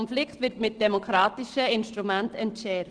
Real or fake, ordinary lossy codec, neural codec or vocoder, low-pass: real; Opus, 16 kbps; none; 9.9 kHz